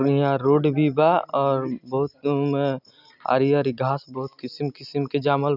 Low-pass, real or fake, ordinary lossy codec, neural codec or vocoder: 5.4 kHz; real; none; none